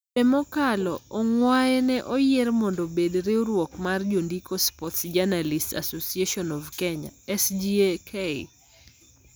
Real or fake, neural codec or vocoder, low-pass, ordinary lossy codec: real; none; none; none